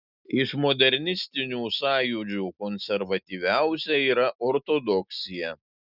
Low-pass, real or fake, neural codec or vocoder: 5.4 kHz; real; none